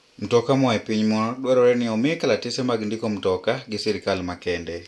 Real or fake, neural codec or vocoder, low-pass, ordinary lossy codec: real; none; none; none